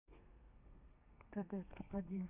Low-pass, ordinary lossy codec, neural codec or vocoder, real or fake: 3.6 kHz; none; codec, 32 kHz, 1.9 kbps, SNAC; fake